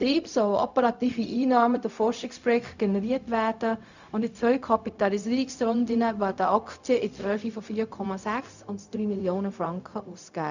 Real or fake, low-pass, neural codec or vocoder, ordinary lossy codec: fake; 7.2 kHz; codec, 16 kHz, 0.4 kbps, LongCat-Audio-Codec; none